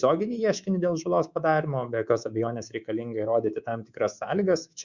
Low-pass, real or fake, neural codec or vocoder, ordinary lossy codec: 7.2 kHz; fake; codec, 24 kHz, 3.1 kbps, DualCodec; Opus, 64 kbps